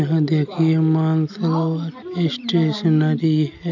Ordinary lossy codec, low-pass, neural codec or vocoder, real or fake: none; 7.2 kHz; none; real